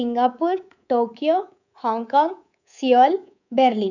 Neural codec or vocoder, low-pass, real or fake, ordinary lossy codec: codec, 24 kHz, 3.1 kbps, DualCodec; 7.2 kHz; fake; none